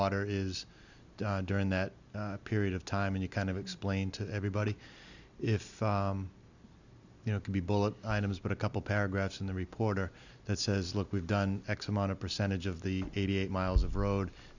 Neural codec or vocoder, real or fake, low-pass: none; real; 7.2 kHz